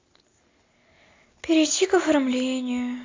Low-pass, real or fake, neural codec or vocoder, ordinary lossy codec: 7.2 kHz; real; none; AAC, 32 kbps